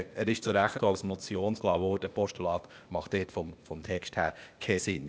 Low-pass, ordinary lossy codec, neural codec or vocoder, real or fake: none; none; codec, 16 kHz, 0.8 kbps, ZipCodec; fake